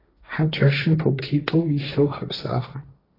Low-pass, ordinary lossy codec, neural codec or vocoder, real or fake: 5.4 kHz; AAC, 24 kbps; codec, 16 kHz, 1.1 kbps, Voila-Tokenizer; fake